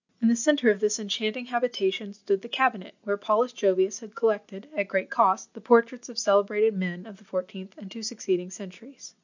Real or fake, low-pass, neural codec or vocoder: real; 7.2 kHz; none